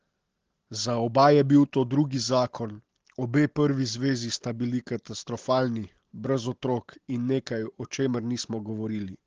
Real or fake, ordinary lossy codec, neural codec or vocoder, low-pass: real; Opus, 16 kbps; none; 7.2 kHz